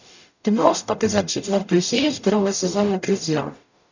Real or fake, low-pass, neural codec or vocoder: fake; 7.2 kHz; codec, 44.1 kHz, 0.9 kbps, DAC